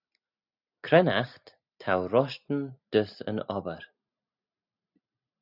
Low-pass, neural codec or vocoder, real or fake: 5.4 kHz; none; real